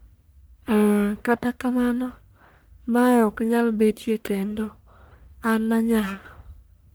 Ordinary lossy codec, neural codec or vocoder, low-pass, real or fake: none; codec, 44.1 kHz, 1.7 kbps, Pupu-Codec; none; fake